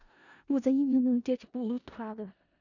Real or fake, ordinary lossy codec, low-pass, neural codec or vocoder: fake; none; 7.2 kHz; codec, 16 kHz in and 24 kHz out, 0.4 kbps, LongCat-Audio-Codec, four codebook decoder